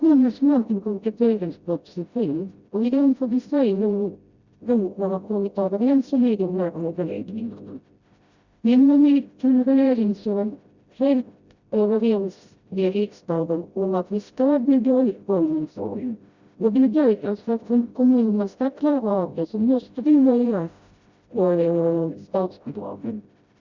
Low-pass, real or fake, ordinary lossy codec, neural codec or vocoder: 7.2 kHz; fake; Opus, 64 kbps; codec, 16 kHz, 0.5 kbps, FreqCodec, smaller model